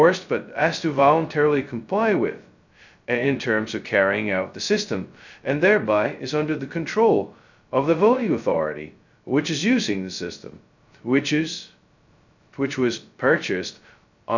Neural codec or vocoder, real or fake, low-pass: codec, 16 kHz, 0.2 kbps, FocalCodec; fake; 7.2 kHz